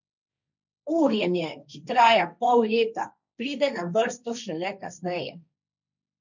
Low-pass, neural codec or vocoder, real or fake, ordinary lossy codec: 7.2 kHz; codec, 16 kHz, 1.1 kbps, Voila-Tokenizer; fake; none